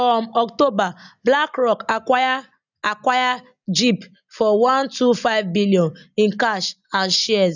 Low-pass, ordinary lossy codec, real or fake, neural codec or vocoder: 7.2 kHz; none; real; none